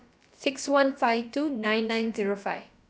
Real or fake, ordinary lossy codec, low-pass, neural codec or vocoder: fake; none; none; codec, 16 kHz, about 1 kbps, DyCAST, with the encoder's durations